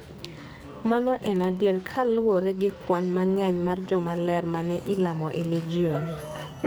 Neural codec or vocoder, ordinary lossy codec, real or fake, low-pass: codec, 44.1 kHz, 2.6 kbps, SNAC; none; fake; none